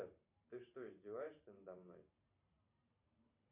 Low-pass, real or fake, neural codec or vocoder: 3.6 kHz; fake; vocoder, 44.1 kHz, 128 mel bands every 512 samples, BigVGAN v2